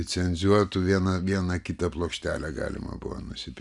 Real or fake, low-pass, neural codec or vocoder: real; 10.8 kHz; none